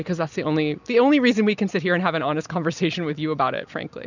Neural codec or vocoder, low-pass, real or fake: none; 7.2 kHz; real